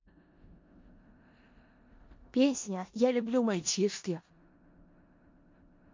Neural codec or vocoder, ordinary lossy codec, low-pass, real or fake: codec, 16 kHz in and 24 kHz out, 0.4 kbps, LongCat-Audio-Codec, four codebook decoder; MP3, 48 kbps; 7.2 kHz; fake